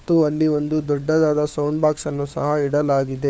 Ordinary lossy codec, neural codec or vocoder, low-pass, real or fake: none; codec, 16 kHz, 4 kbps, FunCodec, trained on LibriTTS, 50 frames a second; none; fake